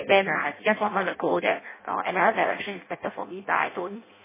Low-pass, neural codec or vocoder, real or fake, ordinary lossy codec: 3.6 kHz; codec, 16 kHz in and 24 kHz out, 0.6 kbps, FireRedTTS-2 codec; fake; MP3, 16 kbps